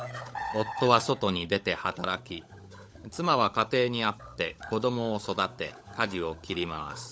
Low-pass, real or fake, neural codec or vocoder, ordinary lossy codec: none; fake; codec, 16 kHz, 16 kbps, FunCodec, trained on LibriTTS, 50 frames a second; none